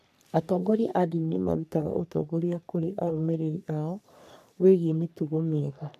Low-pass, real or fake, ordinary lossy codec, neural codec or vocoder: 14.4 kHz; fake; none; codec, 44.1 kHz, 3.4 kbps, Pupu-Codec